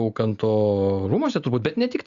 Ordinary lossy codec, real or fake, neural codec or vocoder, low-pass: Opus, 64 kbps; real; none; 7.2 kHz